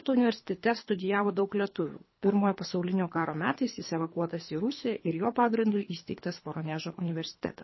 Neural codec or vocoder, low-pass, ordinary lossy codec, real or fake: codec, 24 kHz, 3 kbps, HILCodec; 7.2 kHz; MP3, 24 kbps; fake